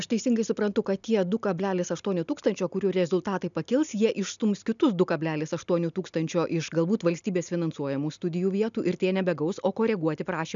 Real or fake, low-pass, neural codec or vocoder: real; 7.2 kHz; none